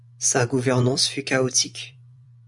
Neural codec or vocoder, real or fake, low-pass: vocoder, 24 kHz, 100 mel bands, Vocos; fake; 10.8 kHz